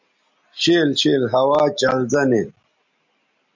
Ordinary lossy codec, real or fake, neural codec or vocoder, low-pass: MP3, 64 kbps; real; none; 7.2 kHz